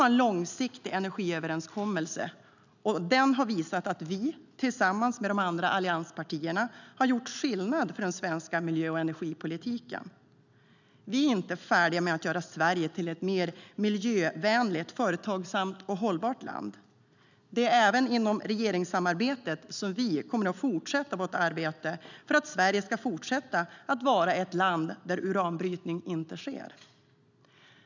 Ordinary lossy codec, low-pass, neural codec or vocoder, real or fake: none; 7.2 kHz; none; real